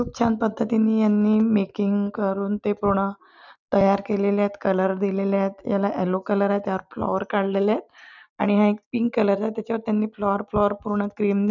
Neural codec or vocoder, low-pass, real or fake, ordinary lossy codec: none; 7.2 kHz; real; none